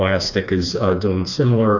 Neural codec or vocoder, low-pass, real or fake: codec, 16 kHz, 2 kbps, FreqCodec, smaller model; 7.2 kHz; fake